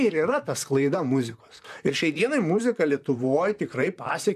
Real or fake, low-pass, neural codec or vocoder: fake; 14.4 kHz; vocoder, 44.1 kHz, 128 mel bands, Pupu-Vocoder